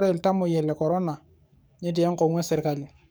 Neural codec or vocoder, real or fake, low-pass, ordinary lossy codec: codec, 44.1 kHz, 7.8 kbps, DAC; fake; none; none